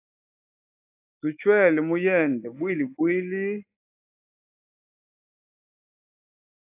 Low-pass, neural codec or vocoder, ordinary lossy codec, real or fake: 3.6 kHz; autoencoder, 48 kHz, 128 numbers a frame, DAC-VAE, trained on Japanese speech; AAC, 24 kbps; fake